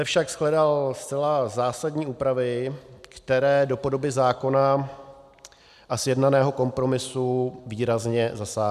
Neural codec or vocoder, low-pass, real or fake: none; 14.4 kHz; real